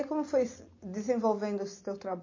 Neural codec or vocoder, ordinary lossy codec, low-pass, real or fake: none; MP3, 32 kbps; 7.2 kHz; real